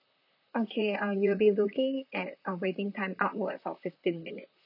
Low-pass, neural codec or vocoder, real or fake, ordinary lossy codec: 5.4 kHz; vocoder, 44.1 kHz, 128 mel bands, Pupu-Vocoder; fake; none